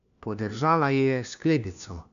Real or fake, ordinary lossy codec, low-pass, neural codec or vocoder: fake; none; 7.2 kHz; codec, 16 kHz, 1 kbps, FunCodec, trained on LibriTTS, 50 frames a second